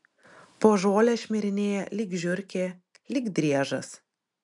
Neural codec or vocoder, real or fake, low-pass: none; real; 10.8 kHz